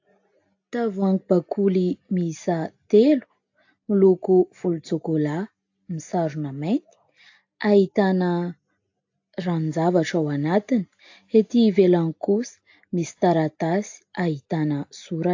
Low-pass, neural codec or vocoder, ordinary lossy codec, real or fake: 7.2 kHz; none; AAC, 48 kbps; real